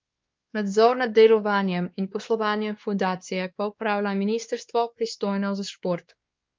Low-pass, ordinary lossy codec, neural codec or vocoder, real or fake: 7.2 kHz; Opus, 24 kbps; codec, 16 kHz, 2 kbps, X-Codec, WavLM features, trained on Multilingual LibriSpeech; fake